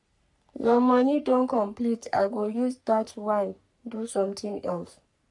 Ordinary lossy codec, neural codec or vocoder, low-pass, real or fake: none; codec, 44.1 kHz, 3.4 kbps, Pupu-Codec; 10.8 kHz; fake